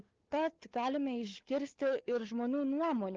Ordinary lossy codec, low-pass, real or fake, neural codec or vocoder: Opus, 16 kbps; 7.2 kHz; fake; codec, 16 kHz, 4 kbps, FunCodec, trained on Chinese and English, 50 frames a second